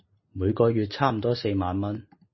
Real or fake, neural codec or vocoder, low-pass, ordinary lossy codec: real; none; 7.2 kHz; MP3, 24 kbps